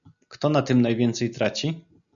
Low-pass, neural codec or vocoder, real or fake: 7.2 kHz; none; real